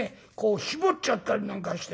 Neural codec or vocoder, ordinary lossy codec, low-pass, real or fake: none; none; none; real